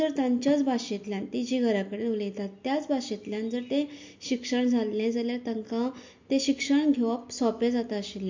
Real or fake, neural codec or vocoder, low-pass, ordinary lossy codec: real; none; 7.2 kHz; MP3, 48 kbps